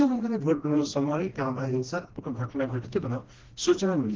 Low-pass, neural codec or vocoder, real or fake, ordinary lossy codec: 7.2 kHz; codec, 16 kHz, 1 kbps, FreqCodec, smaller model; fake; Opus, 16 kbps